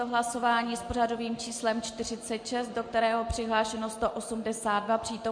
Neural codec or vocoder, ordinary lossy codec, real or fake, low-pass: none; MP3, 48 kbps; real; 9.9 kHz